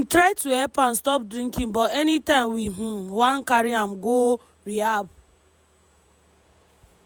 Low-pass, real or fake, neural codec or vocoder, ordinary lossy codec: none; fake; vocoder, 48 kHz, 128 mel bands, Vocos; none